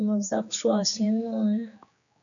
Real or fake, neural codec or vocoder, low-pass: fake; codec, 16 kHz, 2 kbps, X-Codec, HuBERT features, trained on general audio; 7.2 kHz